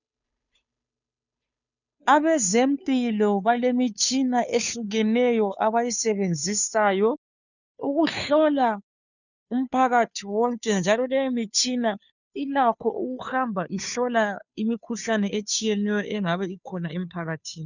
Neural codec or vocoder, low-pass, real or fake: codec, 16 kHz, 2 kbps, FunCodec, trained on Chinese and English, 25 frames a second; 7.2 kHz; fake